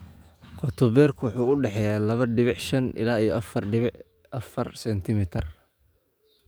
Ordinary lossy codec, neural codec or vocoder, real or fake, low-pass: none; codec, 44.1 kHz, 7.8 kbps, DAC; fake; none